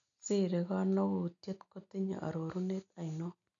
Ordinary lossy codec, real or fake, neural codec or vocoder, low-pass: none; real; none; 7.2 kHz